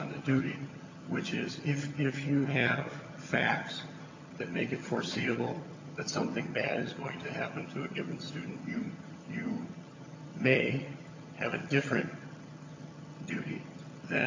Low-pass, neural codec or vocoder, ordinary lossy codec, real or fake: 7.2 kHz; vocoder, 22.05 kHz, 80 mel bands, HiFi-GAN; MP3, 48 kbps; fake